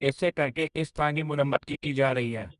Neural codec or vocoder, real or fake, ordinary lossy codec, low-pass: codec, 24 kHz, 0.9 kbps, WavTokenizer, medium music audio release; fake; none; 10.8 kHz